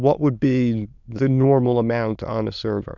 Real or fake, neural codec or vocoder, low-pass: fake; autoencoder, 22.05 kHz, a latent of 192 numbers a frame, VITS, trained on many speakers; 7.2 kHz